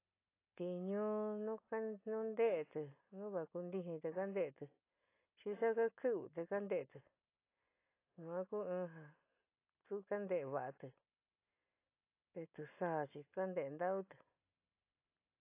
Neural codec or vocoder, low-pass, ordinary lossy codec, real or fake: none; 3.6 kHz; AAC, 24 kbps; real